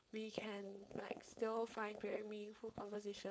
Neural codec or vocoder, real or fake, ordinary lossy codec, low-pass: codec, 16 kHz, 4.8 kbps, FACodec; fake; none; none